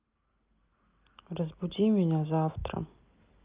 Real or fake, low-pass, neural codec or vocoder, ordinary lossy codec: real; 3.6 kHz; none; Opus, 32 kbps